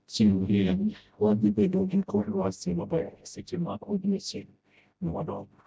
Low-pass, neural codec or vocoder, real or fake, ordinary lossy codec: none; codec, 16 kHz, 0.5 kbps, FreqCodec, smaller model; fake; none